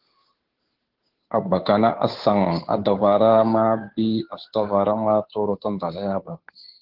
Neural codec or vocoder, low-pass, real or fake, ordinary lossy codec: codec, 16 kHz, 2 kbps, FunCodec, trained on Chinese and English, 25 frames a second; 5.4 kHz; fake; Opus, 16 kbps